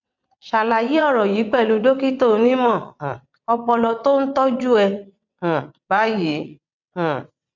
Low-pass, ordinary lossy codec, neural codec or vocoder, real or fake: 7.2 kHz; none; vocoder, 22.05 kHz, 80 mel bands, WaveNeXt; fake